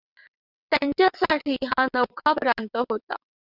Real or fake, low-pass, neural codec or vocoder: fake; 5.4 kHz; vocoder, 22.05 kHz, 80 mel bands, WaveNeXt